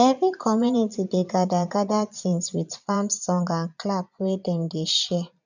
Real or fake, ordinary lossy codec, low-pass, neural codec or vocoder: fake; none; 7.2 kHz; vocoder, 22.05 kHz, 80 mel bands, Vocos